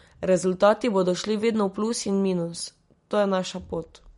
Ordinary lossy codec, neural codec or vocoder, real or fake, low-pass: MP3, 48 kbps; none; real; 10.8 kHz